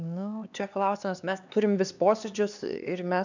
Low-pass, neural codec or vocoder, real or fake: 7.2 kHz; codec, 16 kHz, 2 kbps, X-Codec, HuBERT features, trained on LibriSpeech; fake